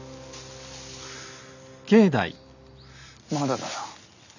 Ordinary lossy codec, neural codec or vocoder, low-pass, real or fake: none; none; 7.2 kHz; real